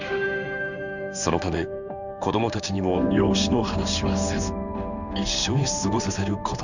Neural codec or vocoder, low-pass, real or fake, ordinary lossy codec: codec, 16 kHz in and 24 kHz out, 1 kbps, XY-Tokenizer; 7.2 kHz; fake; none